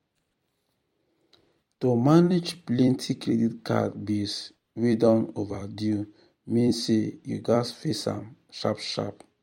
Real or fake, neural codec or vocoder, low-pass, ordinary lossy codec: fake; vocoder, 44.1 kHz, 128 mel bands every 256 samples, BigVGAN v2; 19.8 kHz; MP3, 64 kbps